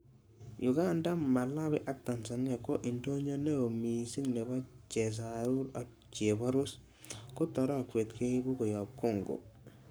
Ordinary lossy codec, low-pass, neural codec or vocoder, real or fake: none; none; codec, 44.1 kHz, 7.8 kbps, Pupu-Codec; fake